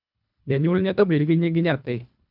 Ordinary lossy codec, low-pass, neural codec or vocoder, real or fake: none; 5.4 kHz; codec, 24 kHz, 1.5 kbps, HILCodec; fake